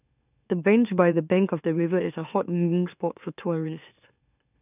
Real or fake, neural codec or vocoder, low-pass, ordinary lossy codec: fake; autoencoder, 44.1 kHz, a latent of 192 numbers a frame, MeloTTS; 3.6 kHz; none